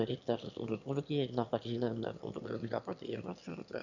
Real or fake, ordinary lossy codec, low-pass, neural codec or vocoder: fake; none; 7.2 kHz; autoencoder, 22.05 kHz, a latent of 192 numbers a frame, VITS, trained on one speaker